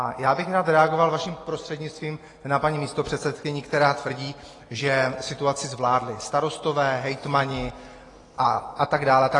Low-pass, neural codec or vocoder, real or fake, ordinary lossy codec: 10.8 kHz; none; real; AAC, 32 kbps